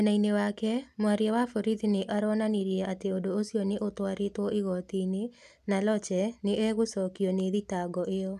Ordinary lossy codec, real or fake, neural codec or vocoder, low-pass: none; real; none; 14.4 kHz